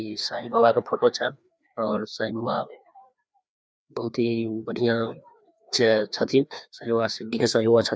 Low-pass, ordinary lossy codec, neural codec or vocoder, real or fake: none; none; codec, 16 kHz, 2 kbps, FreqCodec, larger model; fake